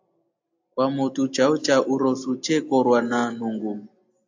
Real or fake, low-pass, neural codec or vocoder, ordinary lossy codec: real; 7.2 kHz; none; AAC, 48 kbps